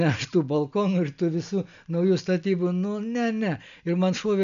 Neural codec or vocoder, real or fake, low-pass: none; real; 7.2 kHz